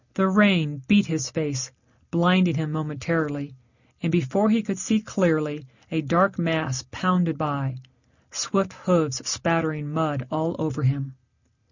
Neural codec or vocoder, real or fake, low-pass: none; real; 7.2 kHz